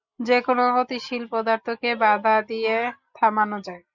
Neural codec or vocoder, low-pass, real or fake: none; 7.2 kHz; real